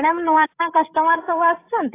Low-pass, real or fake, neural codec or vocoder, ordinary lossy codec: 3.6 kHz; fake; codec, 16 kHz, 16 kbps, FreqCodec, larger model; AAC, 16 kbps